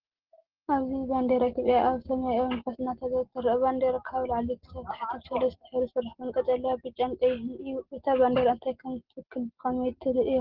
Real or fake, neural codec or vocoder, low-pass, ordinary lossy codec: real; none; 5.4 kHz; Opus, 16 kbps